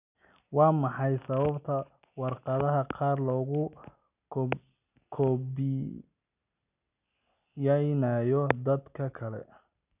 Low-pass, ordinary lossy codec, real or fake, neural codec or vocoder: 3.6 kHz; none; real; none